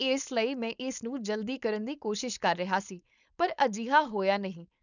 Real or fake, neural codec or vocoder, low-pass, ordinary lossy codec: fake; codec, 16 kHz, 4.8 kbps, FACodec; 7.2 kHz; none